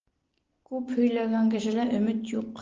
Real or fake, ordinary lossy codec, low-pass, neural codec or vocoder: real; Opus, 16 kbps; 7.2 kHz; none